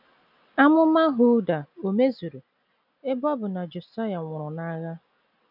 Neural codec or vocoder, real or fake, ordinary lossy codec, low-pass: none; real; none; 5.4 kHz